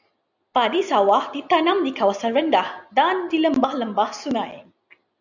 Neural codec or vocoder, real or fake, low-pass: none; real; 7.2 kHz